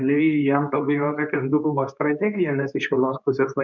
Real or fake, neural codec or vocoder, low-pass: fake; codec, 24 kHz, 0.9 kbps, WavTokenizer, medium speech release version 2; 7.2 kHz